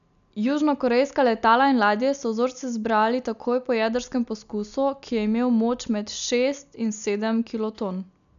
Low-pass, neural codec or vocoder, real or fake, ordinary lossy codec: 7.2 kHz; none; real; none